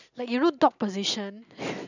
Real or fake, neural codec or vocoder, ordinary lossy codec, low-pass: real; none; none; 7.2 kHz